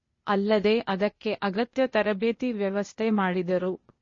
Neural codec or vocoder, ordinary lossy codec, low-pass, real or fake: codec, 16 kHz, 0.8 kbps, ZipCodec; MP3, 32 kbps; 7.2 kHz; fake